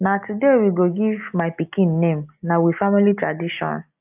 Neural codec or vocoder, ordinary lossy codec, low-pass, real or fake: none; AAC, 32 kbps; 3.6 kHz; real